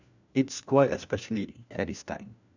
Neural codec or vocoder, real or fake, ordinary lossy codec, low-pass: codec, 16 kHz, 1 kbps, FunCodec, trained on LibriTTS, 50 frames a second; fake; none; 7.2 kHz